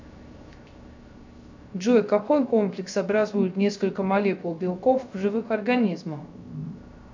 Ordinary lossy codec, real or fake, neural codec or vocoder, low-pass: MP3, 64 kbps; fake; codec, 16 kHz, 0.3 kbps, FocalCodec; 7.2 kHz